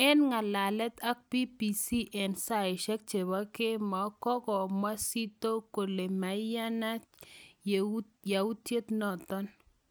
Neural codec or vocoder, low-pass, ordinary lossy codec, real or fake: none; none; none; real